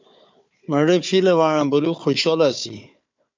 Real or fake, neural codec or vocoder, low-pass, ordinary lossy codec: fake; codec, 16 kHz, 4 kbps, FunCodec, trained on Chinese and English, 50 frames a second; 7.2 kHz; MP3, 64 kbps